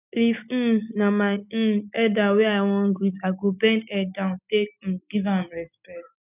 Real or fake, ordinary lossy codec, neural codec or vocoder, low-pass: real; none; none; 3.6 kHz